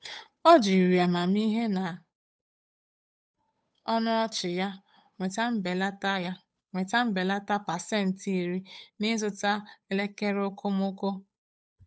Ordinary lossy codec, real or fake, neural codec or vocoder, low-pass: none; fake; codec, 16 kHz, 8 kbps, FunCodec, trained on Chinese and English, 25 frames a second; none